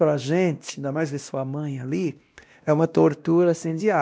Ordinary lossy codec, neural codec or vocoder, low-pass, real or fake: none; codec, 16 kHz, 1 kbps, X-Codec, WavLM features, trained on Multilingual LibriSpeech; none; fake